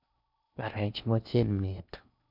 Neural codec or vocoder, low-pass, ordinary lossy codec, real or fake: codec, 16 kHz in and 24 kHz out, 0.6 kbps, FocalCodec, streaming, 4096 codes; 5.4 kHz; none; fake